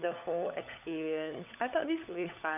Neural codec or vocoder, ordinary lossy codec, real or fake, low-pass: codec, 16 kHz, 16 kbps, FunCodec, trained on LibriTTS, 50 frames a second; none; fake; 3.6 kHz